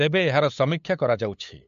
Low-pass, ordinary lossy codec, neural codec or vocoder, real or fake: 7.2 kHz; MP3, 48 kbps; codec, 16 kHz, 8 kbps, FunCodec, trained on LibriTTS, 25 frames a second; fake